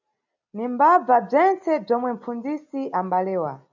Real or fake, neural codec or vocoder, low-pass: real; none; 7.2 kHz